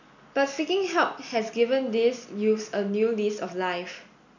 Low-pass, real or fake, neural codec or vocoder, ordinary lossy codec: 7.2 kHz; real; none; none